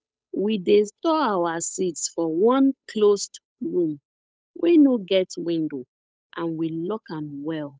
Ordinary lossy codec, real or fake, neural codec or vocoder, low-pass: none; fake; codec, 16 kHz, 8 kbps, FunCodec, trained on Chinese and English, 25 frames a second; none